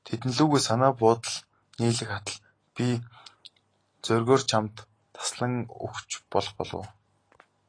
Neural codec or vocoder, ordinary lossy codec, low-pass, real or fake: none; AAC, 32 kbps; 9.9 kHz; real